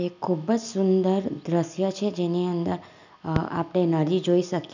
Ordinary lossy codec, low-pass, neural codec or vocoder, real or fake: none; 7.2 kHz; none; real